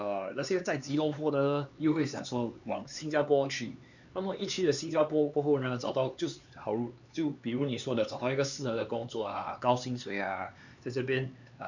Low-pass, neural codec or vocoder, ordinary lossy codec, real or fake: 7.2 kHz; codec, 16 kHz, 4 kbps, X-Codec, HuBERT features, trained on LibriSpeech; none; fake